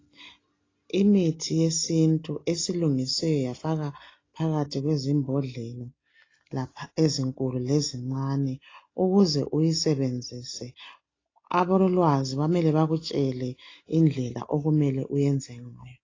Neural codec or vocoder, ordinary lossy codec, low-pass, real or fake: none; AAC, 32 kbps; 7.2 kHz; real